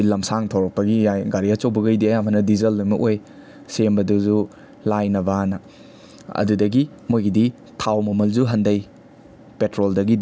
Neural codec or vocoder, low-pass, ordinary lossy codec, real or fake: none; none; none; real